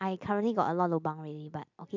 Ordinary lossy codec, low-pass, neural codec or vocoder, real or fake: MP3, 64 kbps; 7.2 kHz; none; real